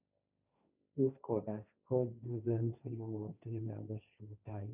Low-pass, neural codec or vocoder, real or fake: 3.6 kHz; codec, 16 kHz, 1.1 kbps, Voila-Tokenizer; fake